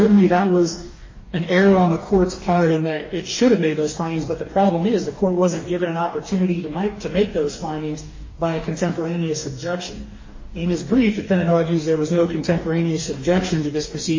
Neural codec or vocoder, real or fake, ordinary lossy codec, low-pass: codec, 44.1 kHz, 2.6 kbps, DAC; fake; MP3, 32 kbps; 7.2 kHz